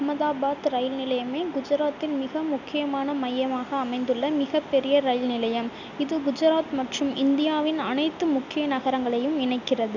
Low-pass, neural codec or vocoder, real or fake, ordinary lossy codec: 7.2 kHz; none; real; none